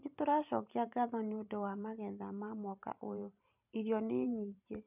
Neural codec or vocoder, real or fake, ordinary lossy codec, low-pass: vocoder, 44.1 kHz, 128 mel bands every 512 samples, BigVGAN v2; fake; none; 3.6 kHz